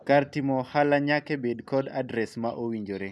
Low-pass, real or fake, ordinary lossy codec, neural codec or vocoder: none; real; none; none